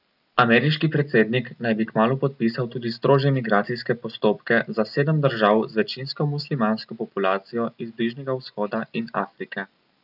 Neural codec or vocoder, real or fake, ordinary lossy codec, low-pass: none; real; none; 5.4 kHz